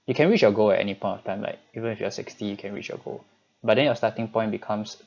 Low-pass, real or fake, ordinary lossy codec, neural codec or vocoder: 7.2 kHz; real; none; none